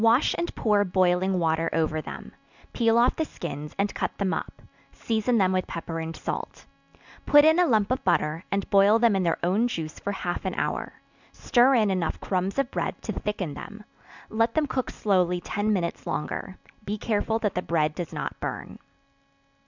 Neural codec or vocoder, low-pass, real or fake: none; 7.2 kHz; real